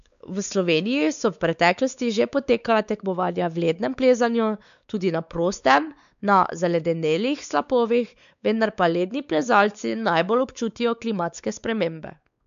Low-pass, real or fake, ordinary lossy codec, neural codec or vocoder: 7.2 kHz; fake; none; codec, 16 kHz, 4 kbps, X-Codec, WavLM features, trained on Multilingual LibriSpeech